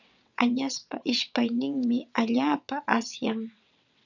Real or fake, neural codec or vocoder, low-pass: fake; vocoder, 22.05 kHz, 80 mel bands, WaveNeXt; 7.2 kHz